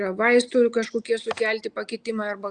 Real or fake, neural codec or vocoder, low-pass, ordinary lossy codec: real; none; 9.9 kHz; Opus, 64 kbps